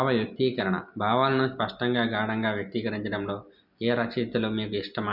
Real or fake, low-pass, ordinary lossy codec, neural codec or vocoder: real; 5.4 kHz; none; none